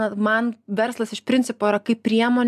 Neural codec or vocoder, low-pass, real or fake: none; 14.4 kHz; real